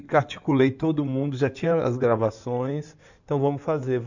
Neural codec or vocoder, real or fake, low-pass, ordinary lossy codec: codec, 16 kHz in and 24 kHz out, 2.2 kbps, FireRedTTS-2 codec; fake; 7.2 kHz; none